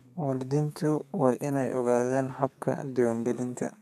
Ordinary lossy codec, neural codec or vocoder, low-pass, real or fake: none; codec, 32 kHz, 1.9 kbps, SNAC; 14.4 kHz; fake